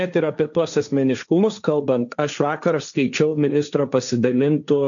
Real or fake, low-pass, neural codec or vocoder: fake; 7.2 kHz; codec, 16 kHz, 1.1 kbps, Voila-Tokenizer